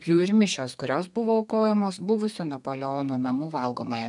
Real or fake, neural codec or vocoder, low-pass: fake; codec, 32 kHz, 1.9 kbps, SNAC; 10.8 kHz